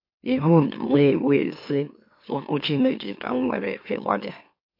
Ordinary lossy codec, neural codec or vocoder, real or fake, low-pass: MP3, 32 kbps; autoencoder, 44.1 kHz, a latent of 192 numbers a frame, MeloTTS; fake; 5.4 kHz